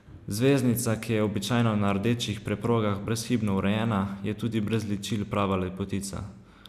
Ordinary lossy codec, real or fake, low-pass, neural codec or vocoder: none; real; 14.4 kHz; none